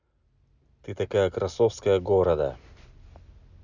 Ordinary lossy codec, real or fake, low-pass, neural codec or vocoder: none; real; 7.2 kHz; none